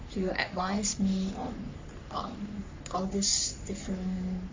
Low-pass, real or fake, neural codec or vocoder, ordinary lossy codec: 7.2 kHz; fake; codec, 44.1 kHz, 3.4 kbps, Pupu-Codec; MP3, 64 kbps